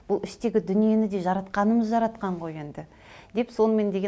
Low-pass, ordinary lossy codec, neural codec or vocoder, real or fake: none; none; none; real